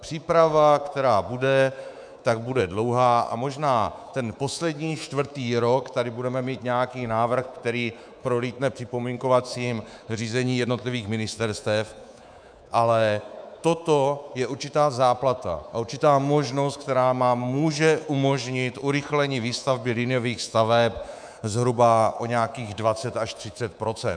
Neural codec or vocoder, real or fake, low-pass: codec, 24 kHz, 3.1 kbps, DualCodec; fake; 9.9 kHz